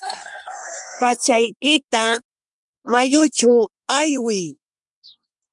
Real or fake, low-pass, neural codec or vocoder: fake; 10.8 kHz; codec, 24 kHz, 1 kbps, SNAC